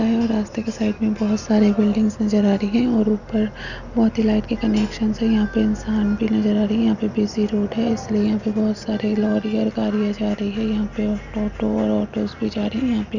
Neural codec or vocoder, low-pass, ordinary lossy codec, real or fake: none; 7.2 kHz; none; real